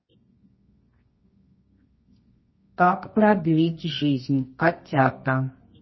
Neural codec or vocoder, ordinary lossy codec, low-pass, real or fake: codec, 24 kHz, 0.9 kbps, WavTokenizer, medium music audio release; MP3, 24 kbps; 7.2 kHz; fake